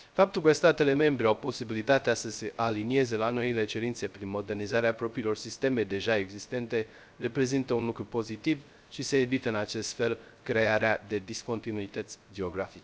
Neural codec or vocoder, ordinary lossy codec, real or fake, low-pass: codec, 16 kHz, 0.3 kbps, FocalCodec; none; fake; none